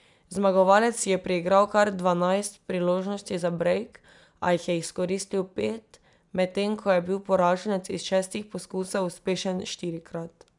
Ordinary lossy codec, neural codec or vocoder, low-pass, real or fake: none; none; 10.8 kHz; real